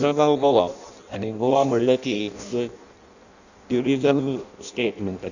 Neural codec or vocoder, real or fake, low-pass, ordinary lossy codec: codec, 16 kHz in and 24 kHz out, 0.6 kbps, FireRedTTS-2 codec; fake; 7.2 kHz; none